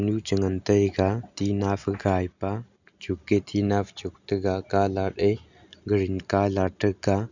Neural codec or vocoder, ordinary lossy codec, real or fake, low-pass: none; none; real; 7.2 kHz